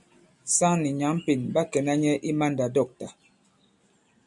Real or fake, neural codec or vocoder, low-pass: real; none; 10.8 kHz